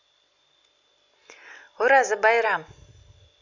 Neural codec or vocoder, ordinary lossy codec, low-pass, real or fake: none; none; 7.2 kHz; real